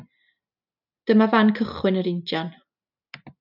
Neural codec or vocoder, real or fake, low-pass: none; real; 5.4 kHz